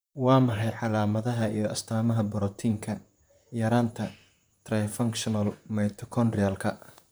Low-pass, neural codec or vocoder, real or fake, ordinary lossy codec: none; vocoder, 44.1 kHz, 128 mel bands, Pupu-Vocoder; fake; none